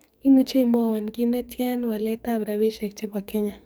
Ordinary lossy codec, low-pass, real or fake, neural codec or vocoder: none; none; fake; codec, 44.1 kHz, 2.6 kbps, SNAC